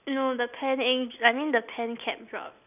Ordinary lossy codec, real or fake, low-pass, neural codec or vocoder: none; real; 3.6 kHz; none